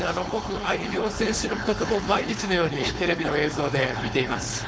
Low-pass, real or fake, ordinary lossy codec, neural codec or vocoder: none; fake; none; codec, 16 kHz, 4.8 kbps, FACodec